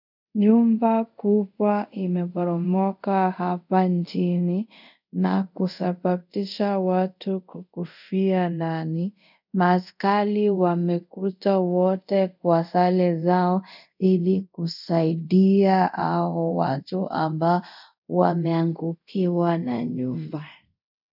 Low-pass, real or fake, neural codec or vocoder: 5.4 kHz; fake; codec, 24 kHz, 0.5 kbps, DualCodec